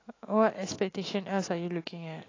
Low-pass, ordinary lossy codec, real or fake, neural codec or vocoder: 7.2 kHz; AAC, 32 kbps; fake; autoencoder, 48 kHz, 128 numbers a frame, DAC-VAE, trained on Japanese speech